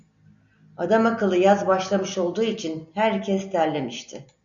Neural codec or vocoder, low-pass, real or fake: none; 7.2 kHz; real